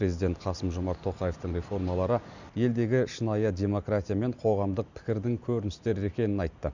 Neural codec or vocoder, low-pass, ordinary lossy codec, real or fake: none; 7.2 kHz; none; real